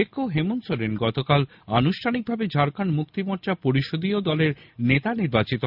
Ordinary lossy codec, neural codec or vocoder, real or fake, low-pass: none; none; real; 5.4 kHz